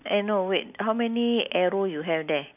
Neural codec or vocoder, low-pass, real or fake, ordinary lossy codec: none; 3.6 kHz; real; none